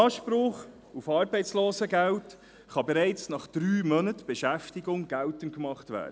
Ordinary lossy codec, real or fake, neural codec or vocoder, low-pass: none; real; none; none